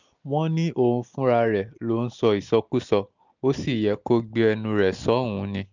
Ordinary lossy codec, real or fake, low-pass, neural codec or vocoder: none; real; 7.2 kHz; none